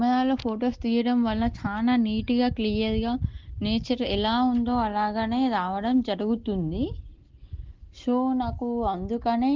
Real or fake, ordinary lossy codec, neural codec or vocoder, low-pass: real; Opus, 16 kbps; none; 7.2 kHz